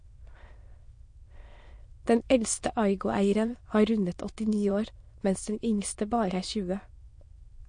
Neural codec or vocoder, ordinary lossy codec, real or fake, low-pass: autoencoder, 22.05 kHz, a latent of 192 numbers a frame, VITS, trained on many speakers; MP3, 48 kbps; fake; 9.9 kHz